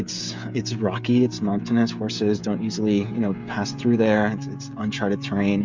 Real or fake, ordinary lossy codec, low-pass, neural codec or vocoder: fake; MP3, 64 kbps; 7.2 kHz; codec, 16 kHz, 16 kbps, FreqCodec, smaller model